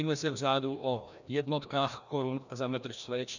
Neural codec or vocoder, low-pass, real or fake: codec, 16 kHz, 1 kbps, FreqCodec, larger model; 7.2 kHz; fake